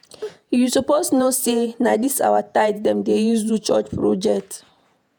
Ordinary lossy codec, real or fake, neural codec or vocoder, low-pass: none; fake; vocoder, 48 kHz, 128 mel bands, Vocos; none